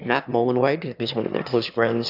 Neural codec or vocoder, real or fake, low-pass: autoencoder, 22.05 kHz, a latent of 192 numbers a frame, VITS, trained on one speaker; fake; 5.4 kHz